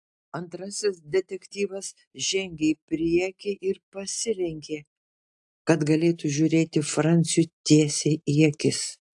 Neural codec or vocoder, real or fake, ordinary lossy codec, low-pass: none; real; AAC, 64 kbps; 10.8 kHz